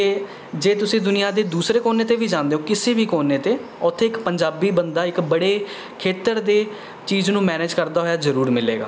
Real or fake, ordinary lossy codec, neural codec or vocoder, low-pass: real; none; none; none